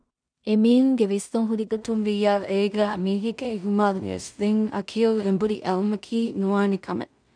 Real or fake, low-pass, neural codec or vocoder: fake; 9.9 kHz; codec, 16 kHz in and 24 kHz out, 0.4 kbps, LongCat-Audio-Codec, two codebook decoder